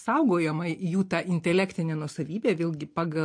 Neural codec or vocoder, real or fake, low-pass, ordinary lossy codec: none; real; 9.9 kHz; MP3, 48 kbps